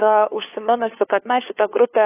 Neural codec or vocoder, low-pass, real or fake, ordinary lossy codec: codec, 16 kHz, 2 kbps, FunCodec, trained on LibriTTS, 25 frames a second; 3.6 kHz; fake; AAC, 24 kbps